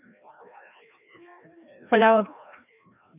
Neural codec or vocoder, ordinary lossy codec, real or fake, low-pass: codec, 16 kHz, 1 kbps, FreqCodec, larger model; none; fake; 3.6 kHz